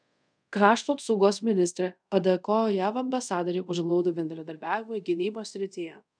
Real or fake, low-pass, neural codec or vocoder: fake; 9.9 kHz; codec, 24 kHz, 0.5 kbps, DualCodec